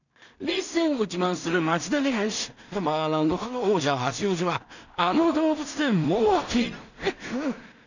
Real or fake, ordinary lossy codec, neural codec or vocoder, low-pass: fake; none; codec, 16 kHz in and 24 kHz out, 0.4 kbps, LongCat-Audio-Codec, two codebook decoder; 7.2 kHz